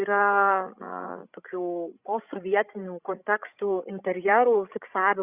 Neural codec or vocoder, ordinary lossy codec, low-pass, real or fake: codec, 16 kHz, 16 kbps, FreqCodec, larger model; Opus, 64 kbps; 3.6 kHz; fake